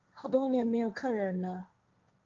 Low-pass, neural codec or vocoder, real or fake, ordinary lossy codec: 7.2 kHz; codec, 16 kHz, 1.1 kbps, Voila-Tokenizer; fake; Opus, 24 kbps